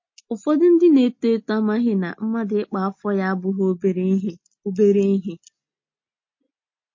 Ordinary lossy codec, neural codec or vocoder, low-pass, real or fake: MP3, 32 kbps; none; 7.2 kHz; real